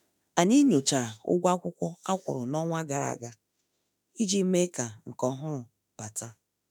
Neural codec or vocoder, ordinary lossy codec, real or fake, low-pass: autoencoder, 48 kHz, 32 numbers a frame, DAC-VAE, trained on Japanese speech; none; fake; none